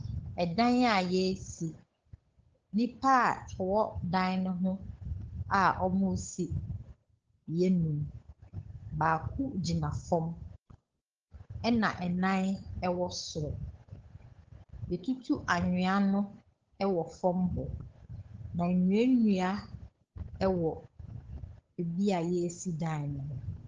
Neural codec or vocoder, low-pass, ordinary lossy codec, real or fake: codec, 16 kHz, 8 kbps, FunCodec, trained on Chinese and English, 25 frames a second; 7.2 kHz; Opus, 16 kbps; fake